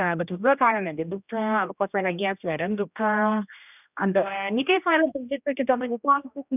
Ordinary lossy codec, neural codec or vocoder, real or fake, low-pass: none; codec, 16 kHz, 1 kbps, X-Codec, HuBERT features, trained on general audio; fake; 3.6 kHz